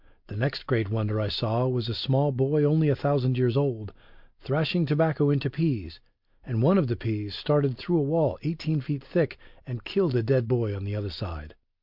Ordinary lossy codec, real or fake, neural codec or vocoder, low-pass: MP3, 48 kbps; real; none; 5.4 kHz